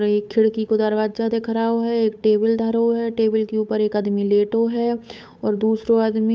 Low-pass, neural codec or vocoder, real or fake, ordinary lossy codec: none; codec, 16 kHz, 8 kbps, FunCodec, trained on Chinese and English, 25 frames a second; fake; none